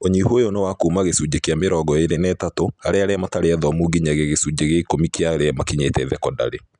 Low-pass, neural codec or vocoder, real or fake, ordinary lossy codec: 10.8 kHz; none; real; none